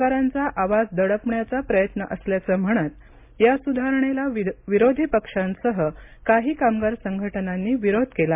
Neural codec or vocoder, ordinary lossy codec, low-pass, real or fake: none; MP3, 32 kbps; 3.6 kHz; real